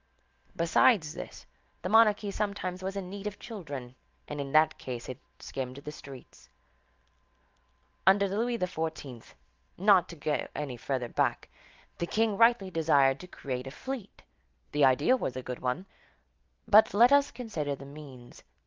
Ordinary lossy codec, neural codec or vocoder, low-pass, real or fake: Opus, 32 kbps; none; 7.2 kHz; real